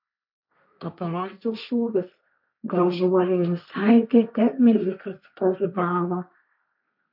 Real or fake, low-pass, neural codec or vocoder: fake; 5.4 kHz; codec, 16 kHz, 1.1 kbps, Voila-Tokenizer